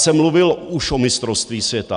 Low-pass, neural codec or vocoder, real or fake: 9.9 kHz; none; real